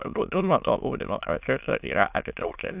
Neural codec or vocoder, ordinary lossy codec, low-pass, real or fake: autoencoder, 22.05 kHz, a latent of 192 numbers a frame, VITS, trained on many speakers; AAC, 32 kbps; 3.6 kHz; fake